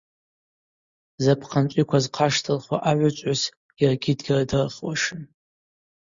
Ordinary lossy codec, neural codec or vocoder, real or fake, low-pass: Opus, 64 kbps; none; real; 7.2 kHz